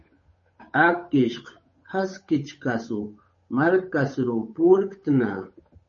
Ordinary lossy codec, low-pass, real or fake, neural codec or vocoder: MP3, 32 kbps; 7.2 kHz; fake; codec, 16 kHz, 8 kbps, FunCodec, trained on Chinese and English, 25 frames a second